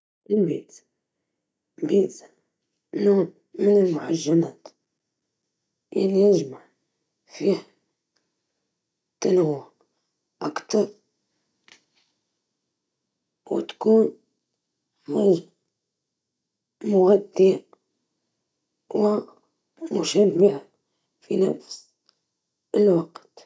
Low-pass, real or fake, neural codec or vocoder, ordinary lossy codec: none; real; none; none